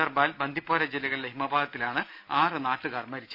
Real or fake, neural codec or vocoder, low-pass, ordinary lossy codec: real; none; 5.4 kHz; none